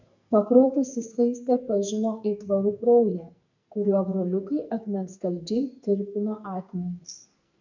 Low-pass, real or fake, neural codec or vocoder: 7.2 kHz; fake; codec, 44.1 kHz, 2.6 kbps, SNAC